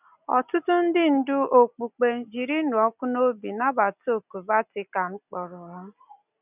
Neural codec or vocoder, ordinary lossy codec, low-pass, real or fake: none; none; 3.6 kHz; real